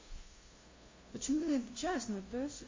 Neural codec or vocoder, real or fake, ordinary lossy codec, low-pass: codec, 16 kHz, 0.5 kbps, FunCodec, trained on LibriTTS, 25 frames a second; fake; MP3, 32 kbps; 7.2 kHz